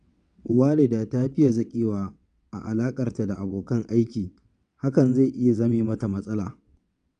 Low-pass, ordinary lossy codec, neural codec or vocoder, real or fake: 9.9 kHz; none; vocoder, 22.05 kHz, 80 mel bands, WaveNeXt; fake